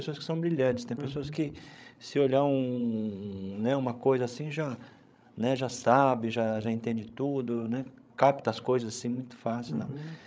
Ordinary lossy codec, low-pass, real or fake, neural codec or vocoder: none; none; fake; codec, 16 kHz, 16 kbps, FreqCodec, larger model